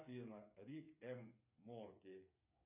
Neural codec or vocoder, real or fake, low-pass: codec, 16 kHz, 8 kbps, FreqCodec, smaller model; fake; 3.6 kHz